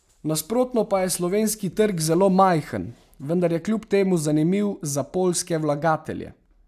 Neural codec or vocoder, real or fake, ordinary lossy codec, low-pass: none; real; AAC, 96 kbps; 14.4 kHz